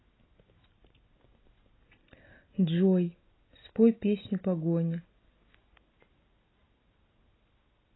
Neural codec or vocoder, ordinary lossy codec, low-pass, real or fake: none; AAC, 16 kbps; 7.2 kHz; real